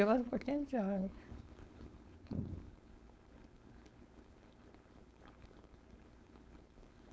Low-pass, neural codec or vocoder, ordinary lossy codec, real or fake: none; codec, 16 kHz, 4.8 kbps, FACodec; none; fake